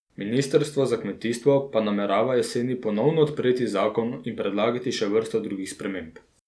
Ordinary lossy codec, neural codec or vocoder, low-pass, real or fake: none; none; none; real